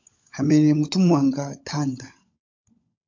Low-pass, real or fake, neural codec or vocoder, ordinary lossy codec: 7.2 kHz; fake; codec, 16 kHz, 16 kbps, FunCodec, trained on LibriTTS, 50 frames a second; AAC, 48 kbps